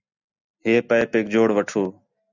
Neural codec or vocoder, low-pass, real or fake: none; 7.2 kHz; real